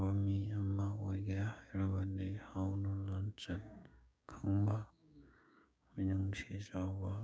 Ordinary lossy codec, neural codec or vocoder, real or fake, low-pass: none; codec, 16 kHz, 6 kbps, DAC; fake; none